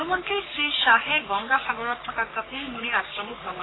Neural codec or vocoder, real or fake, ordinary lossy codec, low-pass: codec, 44.1 kHz, 3.4 kbps, Pupu-Codec; fake; AAC, 16 kbps; 7.2 kHz